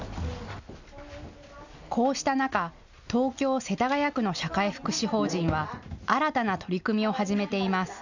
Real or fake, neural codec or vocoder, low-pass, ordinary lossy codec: real; none; 7.2 kHz; none